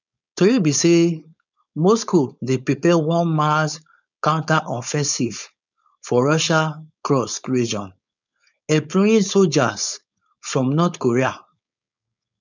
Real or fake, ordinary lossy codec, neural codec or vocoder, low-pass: fake; none; codec, 16 kHz, 4.8 kbps, FACodec; 7.2 kHz